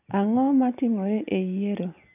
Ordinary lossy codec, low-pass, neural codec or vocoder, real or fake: none; 3.6 kHz; none; real